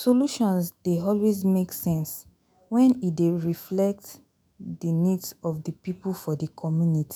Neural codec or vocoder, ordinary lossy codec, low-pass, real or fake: autoencoder, 48 kHz, 128 numbers a frame, DAC-VAE, trained on Japanese speech; none; none; fake